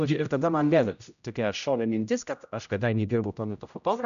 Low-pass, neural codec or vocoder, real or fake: 7.2 kHz; codec, 16 kHz, 0.5 kbps, X-Codec, HuBERT features, trained on general audio; fake